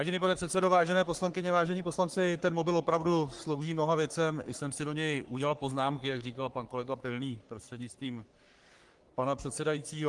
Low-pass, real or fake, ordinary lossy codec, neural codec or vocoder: 10.8 kHz; fake; Opus, 24 kbps; codec, 44.1 kHz, 3.4 kbps, Pupu-Codec